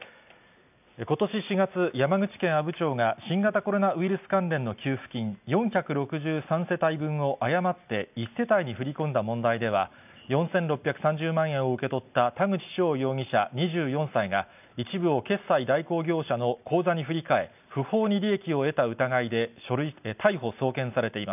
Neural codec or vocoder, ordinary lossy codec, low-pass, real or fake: none; none; 3.6 kHz; real